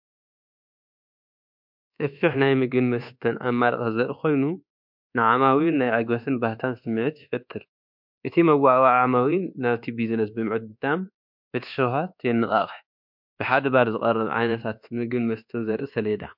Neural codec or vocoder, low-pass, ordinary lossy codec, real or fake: codec, 24 kHz, 1.2 kbps, DualCodec; 5.4 kHz; MP3, 48 kbps; fake